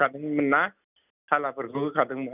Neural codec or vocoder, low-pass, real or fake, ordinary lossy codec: none; 3.6 kHz; real; none